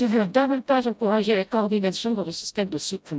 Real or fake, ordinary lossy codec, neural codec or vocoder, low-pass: fake; none; codec, 16 kHz, 0.5 kbps, FreqCodec, smaller model; none